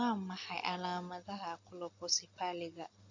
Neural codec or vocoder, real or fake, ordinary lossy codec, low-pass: none; real; AAC, 48 kbps; 7.2 kHz